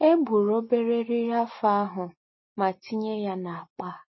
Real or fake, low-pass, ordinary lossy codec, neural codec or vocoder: real; 7.2 kHz; MP3, 24 kbps; none